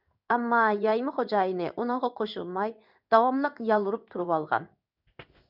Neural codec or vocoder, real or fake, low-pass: codec, 16 kHz in and 24 kHz out, 1 kbps, XY-Tokenizer; fake; 5.4 kHz